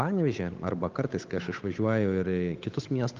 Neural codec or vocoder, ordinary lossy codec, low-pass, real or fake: codec, 16 kHz, 8 kbps, FunCodec, trained on Chinese and English, 25 frames a second; Opus, 32 kbps; 7.2 kHz; fake